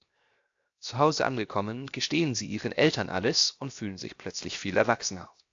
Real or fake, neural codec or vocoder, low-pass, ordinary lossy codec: fake; codec, 16 kHz, 0.7 kbps, FocalCodec; 7.2 kHz; AAC, 64 kbps